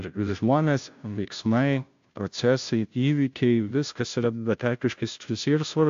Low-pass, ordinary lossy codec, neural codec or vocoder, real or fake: 7.2 kHz; AAC, 64 kbps; codec, 16 kHz, 0.5 kbps, FunCodec, trained on Chinese and English, 25 frames a second; fake